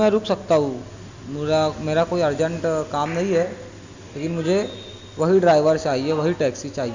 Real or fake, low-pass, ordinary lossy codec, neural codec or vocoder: real; 7.2 kHz; Opus, 64 kbps; none